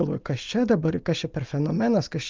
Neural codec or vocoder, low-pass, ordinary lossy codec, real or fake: none; 7.2 kHz; Opus, 24 kbps; real